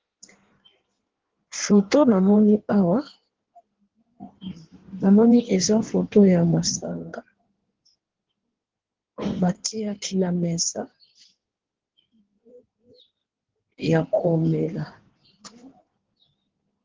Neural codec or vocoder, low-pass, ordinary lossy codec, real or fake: codec, 16 kHz in and 24 kHz out, 1.1 kbps, FireRedTTS-2 codec; 7.2 kHz; Opus, 16 kbps; fake